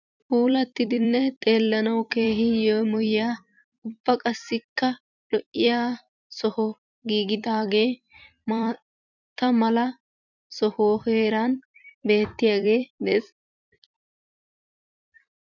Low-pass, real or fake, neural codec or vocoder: 7.2 kHz; fake; vocoder, 44.1 kHz, 128 mel bands every 256 samples, BigVGAN v2